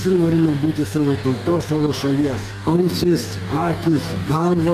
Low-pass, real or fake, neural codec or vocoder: 14.4 kHz; fake; codec, 44.1 kHz, 2.6 kbps, DAC